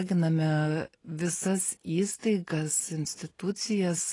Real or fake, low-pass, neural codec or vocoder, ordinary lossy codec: real; 10.8 kHz; none; AAC, 32 kbps